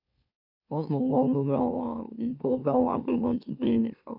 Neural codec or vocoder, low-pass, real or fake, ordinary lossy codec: autoencoder, 44.1 kHz, a latent of 192 numbers a frame, MeloTTS; 5.4 kHz; fake; none